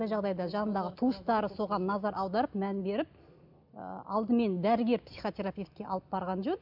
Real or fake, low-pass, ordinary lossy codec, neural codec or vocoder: real; 5.4 kHz; none; none